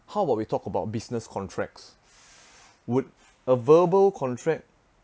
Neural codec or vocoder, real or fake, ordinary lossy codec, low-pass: none; real; none; none